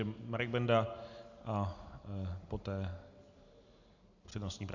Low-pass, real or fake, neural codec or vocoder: 7.2 kHz; real; none